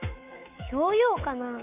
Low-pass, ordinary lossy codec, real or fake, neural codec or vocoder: 3.6 kHz; none; fake; codec, 24 kHz, 3.1 kbps, DualCodec